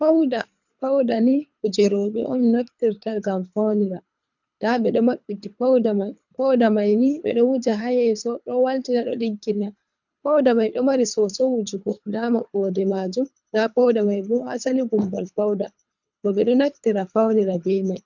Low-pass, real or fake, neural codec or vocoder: 7.2 kHz; fake; codec, 24 kHz, 3 kbps, HILCodec